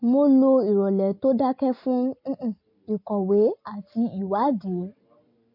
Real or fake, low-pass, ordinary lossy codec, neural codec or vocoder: real; 5.4 kHz; MP3, 32 kbps; none